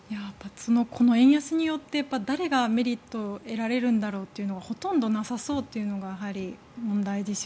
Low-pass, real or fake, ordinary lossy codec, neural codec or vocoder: none; real; none; none